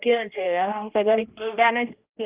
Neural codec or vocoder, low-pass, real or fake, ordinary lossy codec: codec, 16 kHz, 1 kbps, X-Codec, HuBERT features, trained on general audio; 3.6 kHz; fake; Opus, 32 kbps